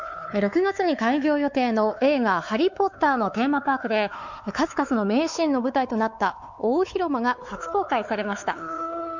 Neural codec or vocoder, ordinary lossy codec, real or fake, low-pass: codec, 16 kHz, 4 kbps, X-Codec, WavLM features, trained on Multilingual LibriSpeech; none; fake; 7.2 kHz